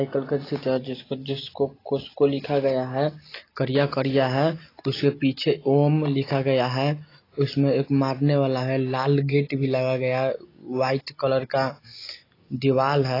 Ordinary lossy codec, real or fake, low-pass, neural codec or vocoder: AAC, 24 kbps; real; 5.4 kHz; none